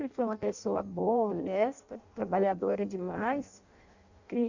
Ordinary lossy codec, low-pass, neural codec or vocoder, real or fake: Opus, 64 kbps; 7.2 kHz; codec, 16 kHz in and 24 kHz out, 0.6 kbps, FireRedTTS-2 codec; fake